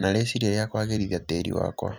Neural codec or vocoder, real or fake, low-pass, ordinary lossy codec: vocoder, 44.1 kHz, 128 mel bands every 256 samples, BigVGAN v2; fake; none; none